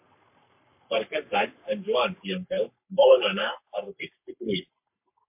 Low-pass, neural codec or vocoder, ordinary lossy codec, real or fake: 3.6 kHz; codec, 44.1 kHz, 3.4 kbps, Pupu-Codec; MP3, 32 kbps; fake